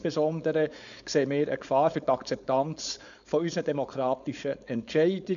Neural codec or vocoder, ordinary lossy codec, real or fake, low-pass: codec, 16 kHz, 4.8 kbps, FACodec; none; fake; 7.2 kHz